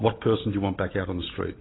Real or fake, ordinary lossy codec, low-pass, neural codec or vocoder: real; AAC, 16 kbps; 7.2 kHz; none